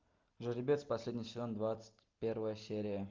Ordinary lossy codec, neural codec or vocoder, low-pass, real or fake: Opus, 32 kbps; none; 7.2 kHz; real